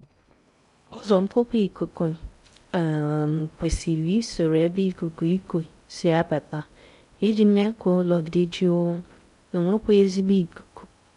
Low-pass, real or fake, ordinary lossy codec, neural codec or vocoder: 10.8 kHz; fake; MP3, 96 kbps; codec, 16 kHz in and 24 kHz out, 0.6 kbps, FocalCodec, streaming, 2048 codes